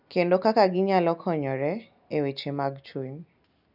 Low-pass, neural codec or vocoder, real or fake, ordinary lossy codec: 5.4 kHz; none; real; none